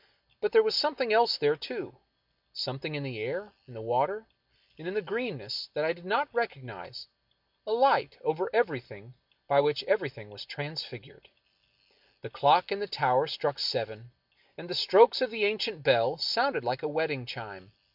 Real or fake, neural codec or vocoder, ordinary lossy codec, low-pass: real; none; MP3, 48 kbps; 5.4 kHz